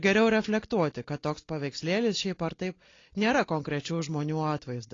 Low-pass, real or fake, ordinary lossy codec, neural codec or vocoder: 7.2 kHz; real; AAC, 32 kbps; none